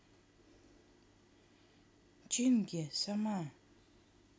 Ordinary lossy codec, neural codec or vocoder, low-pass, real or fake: none; none; none; real